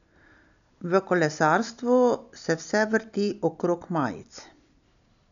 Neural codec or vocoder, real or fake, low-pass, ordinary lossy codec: none; real; 7.2 kHz; none